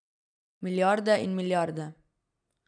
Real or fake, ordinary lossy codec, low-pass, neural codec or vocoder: real; none; 9.9 kHz; none